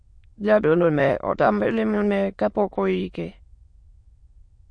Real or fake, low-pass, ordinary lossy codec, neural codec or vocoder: fake; 9.9 kHz; MP3, 48 kbps; autoencoder, 22.05 kHz, a latent of 192 numbers a frame, VITS, trained on many speakers